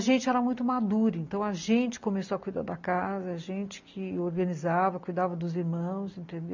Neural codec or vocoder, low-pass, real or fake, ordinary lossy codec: none; 7.2 kHz; real; MP3, 64 kbps